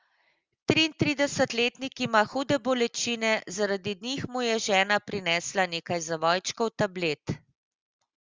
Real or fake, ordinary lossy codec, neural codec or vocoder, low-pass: real; Opus, 32 kbps; none; 7.2 kHz